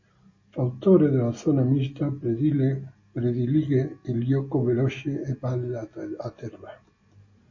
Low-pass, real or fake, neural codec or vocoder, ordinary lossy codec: 7.2 kHz; real; none; MP3, 32 kbps